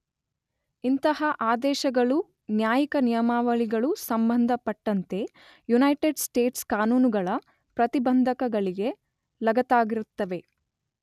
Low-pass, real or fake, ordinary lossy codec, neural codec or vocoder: 14.4 kHz; real; none; none